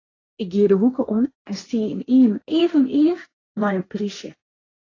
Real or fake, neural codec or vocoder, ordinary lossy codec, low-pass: fake; codec, 16 kHz, 1.1 kbps, Voila-Tokenizer; AAC, 32 kbps; 7.2 kHz